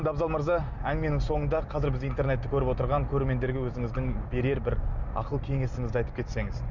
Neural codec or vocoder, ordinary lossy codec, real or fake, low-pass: none; none; real; 7.2 kHz